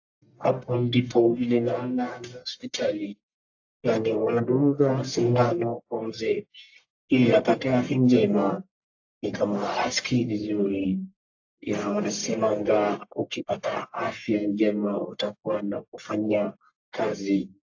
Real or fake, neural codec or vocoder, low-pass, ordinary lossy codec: fake; codec, 44.1 kHz, 1.7 kbps, Pupu-Codec; 7.2 kHz; AAC, 48 kbps